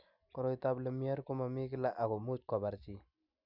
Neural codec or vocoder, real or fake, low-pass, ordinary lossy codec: none; real; 5.4 kHz; none